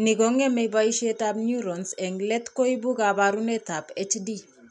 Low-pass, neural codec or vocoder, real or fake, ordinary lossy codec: 10.8 kHz; none; real; none